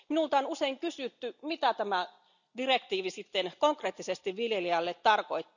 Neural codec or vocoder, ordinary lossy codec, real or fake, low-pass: none; none; real; 7.2 kHz